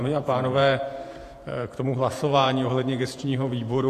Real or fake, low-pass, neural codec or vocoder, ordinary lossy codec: fake; 14.4 kHz; vocoder, 48 kHz, 128 mel bands, Vocos; AAC, 64 kbps